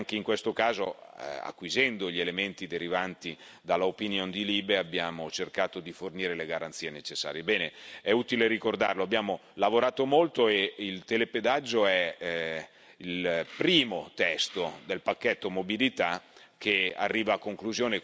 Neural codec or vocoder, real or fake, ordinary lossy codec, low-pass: none; real; none; none